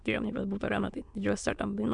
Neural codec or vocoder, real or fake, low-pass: autoencoder, 22.05 kHz, a latent of 192 numbers a frame, VITS, trained on many speakers; fake; 9.9 kHz